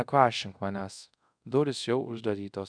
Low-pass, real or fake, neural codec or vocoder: 9.9 kHz; fake; codec, 24 kHz, 0.5 kbps, DualCodec